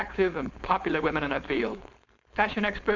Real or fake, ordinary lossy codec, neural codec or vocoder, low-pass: fake; MP3, 64 kbps; codec, 16 kHz, 4.8 kbps, FACodec; 7.2 kHz